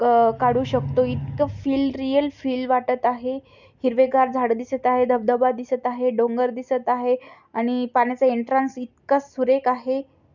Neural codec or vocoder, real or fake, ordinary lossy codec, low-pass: none; real; none; 7.2 kHz